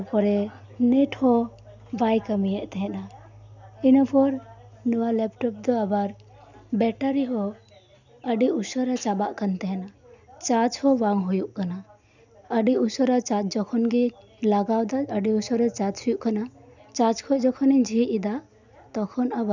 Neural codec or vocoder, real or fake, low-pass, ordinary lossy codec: none; real; 7.2 kHz; none